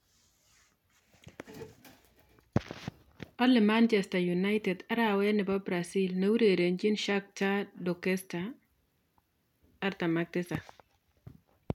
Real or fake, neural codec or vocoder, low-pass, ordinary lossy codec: real; none; 19.8 kHz; none